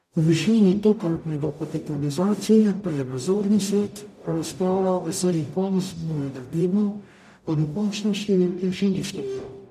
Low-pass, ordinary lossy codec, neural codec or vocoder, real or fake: 14.4 kHz; none; codec, 44.1 kHz, 0.9 kbps, DAC; fake